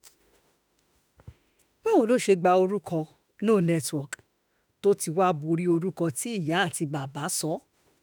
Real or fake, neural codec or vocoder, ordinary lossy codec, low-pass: fake; autoencoder, 48 kHz, 32 numbers a frame, DAC-VAE, trained on Japanese speech; none; none